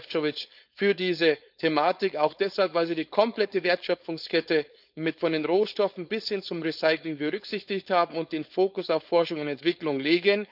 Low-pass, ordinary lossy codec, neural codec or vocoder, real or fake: 5.4 kHz; none; codec, 16 kHz, 4.8 kbps, FACodec; fake